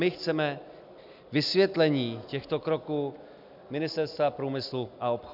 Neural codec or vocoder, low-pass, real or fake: none; 5.4 kHz; real